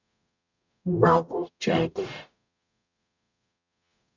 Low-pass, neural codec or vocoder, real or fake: 7.2 kHz; codec, 44.1 kHz, 0.9 kbps, DAC; fake